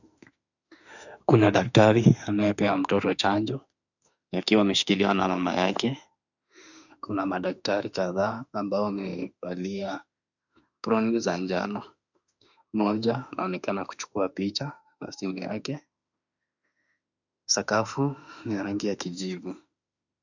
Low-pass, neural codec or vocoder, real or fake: 7.2 kHz; autoencoder, 48 kHz, 32 numbers a frame, DAC-VAE, trained on Japanese speech; fake